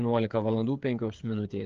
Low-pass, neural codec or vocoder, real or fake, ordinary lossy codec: 7.2 kHz; codec, 16 kHz, 8 kbps, FreqCodec, smaller model; fake; Opus, 32 kbps